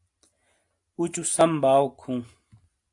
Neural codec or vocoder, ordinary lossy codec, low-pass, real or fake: none; AAC, 64 kbps; 10.8 kHz; real